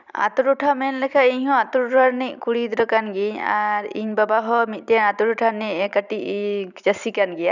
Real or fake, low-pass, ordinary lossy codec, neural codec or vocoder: fake; 7.2 kHz; none; vocoder, 44.1 kHz, 128 mel bands every 256 samples, BigVGAN v2